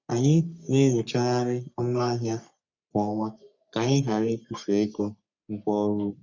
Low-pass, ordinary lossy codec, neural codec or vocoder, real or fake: 7.2 kHz; none; codec, 44.1 kHz, 3.4 kbps, Pupu-Codec; fake